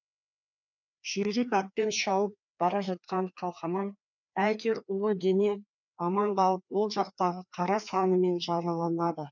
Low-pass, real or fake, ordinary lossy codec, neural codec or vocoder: 7.2 kHz; fake; none; codec, 16 kHz, 2 kbps, FreqCodec, larger model